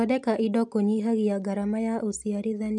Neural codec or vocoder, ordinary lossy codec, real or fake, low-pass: none; none; real; 10.8 kHz